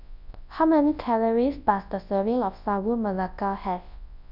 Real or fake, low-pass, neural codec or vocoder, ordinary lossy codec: fake; 5.4 kHz; codec, 24 kHz, 0.9 kbps, WavTokenizer, large speech release; none